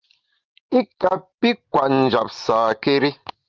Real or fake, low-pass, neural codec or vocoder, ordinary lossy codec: real; 7.2 kHz; none; Opus, 24 kbps